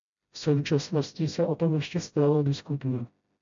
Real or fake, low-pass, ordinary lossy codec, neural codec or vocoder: fake; 7.2 kHz; MP3, 64 kbps; codec, 16 kHz, 0.5 kbps, FreqCodec, smaller model